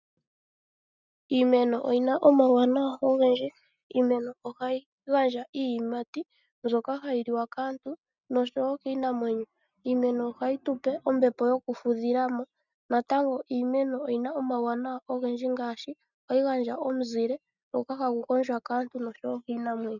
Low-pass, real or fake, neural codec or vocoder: 7.2 kHz; real; none